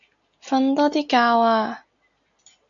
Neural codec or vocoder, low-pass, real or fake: none; 7.2 kHz; real